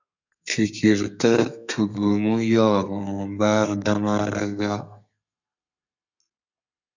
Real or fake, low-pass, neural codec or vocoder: fake; 7.2 kHz; codec, 32 kHz, 1.9 kbps, SNAC